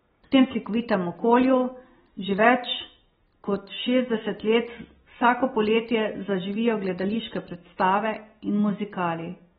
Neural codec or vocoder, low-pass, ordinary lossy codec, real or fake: none; 19.8 kHz; AAC, 16 kbps; real